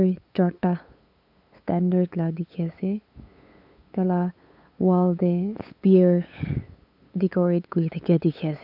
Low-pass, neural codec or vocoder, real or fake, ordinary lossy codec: 5.4 kHz; codec, 16 kHz, 8 kbps, FunCodec, trained on LibriTTS, 25 frames a second; fake; none